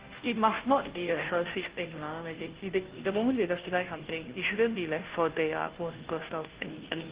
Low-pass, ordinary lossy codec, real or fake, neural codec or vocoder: 3.6 kHz; Opus, 16 kbps; fake; codec, 16 kHz, 0.5 kbps, FunCodec, trained on Chinese and English, 25 frames a second